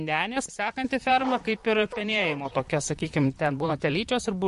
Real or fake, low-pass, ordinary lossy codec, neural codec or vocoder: fake; 14.4 kHz; MP3, 48 kbps; vocoder, 44.1 kHz, 128 mel bands, Pupu-Vocoder